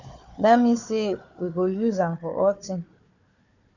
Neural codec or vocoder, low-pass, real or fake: codec, 16 kHz, 4 kbps, FunCodec, trained on Chinese and English, 50 frames a second; 7.2 kHz; fake